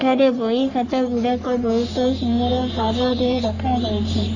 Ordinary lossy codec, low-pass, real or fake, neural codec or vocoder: none; 7.2 kHz; fake; codec, 44.1 kHz, 3.4 kbps, Pupu-Codec